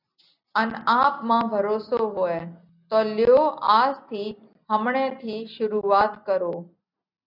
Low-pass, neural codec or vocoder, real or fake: 5.4 kHz; none; real